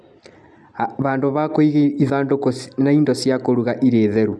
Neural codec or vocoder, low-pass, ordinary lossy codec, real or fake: none; none; none; real